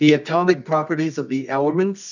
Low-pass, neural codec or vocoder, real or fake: 7.2 kHz; codec, 24 kHz, 0.9 kbps, WavTokenizer, medium music audio release; fake